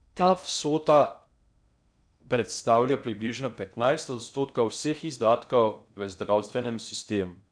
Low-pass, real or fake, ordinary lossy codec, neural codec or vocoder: 9.9 kHz; fake; none; codec, 16 kHz in and 24 kHz out, 0.6 kbps, FocalCodec, streaming, 2048 codes